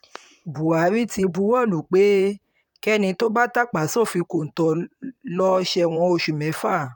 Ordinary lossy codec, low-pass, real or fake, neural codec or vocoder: none; 19.8 kHz; fake; vocoder, 44.1 kHz, 128 mel bands, Pupu-Vocoder